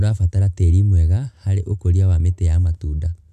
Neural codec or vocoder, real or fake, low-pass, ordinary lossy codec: none; real; 14.4 kHz; none